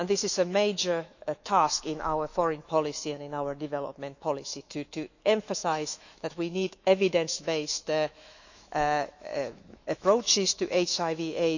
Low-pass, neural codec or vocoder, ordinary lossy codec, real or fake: 7.2 kHz; autoencoder, 48 kHz, 128 numbers a frame, DAC-VAE, trained on Japanese speech; none; fake